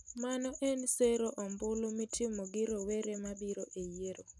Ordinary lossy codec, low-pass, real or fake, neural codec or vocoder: none; none; real; none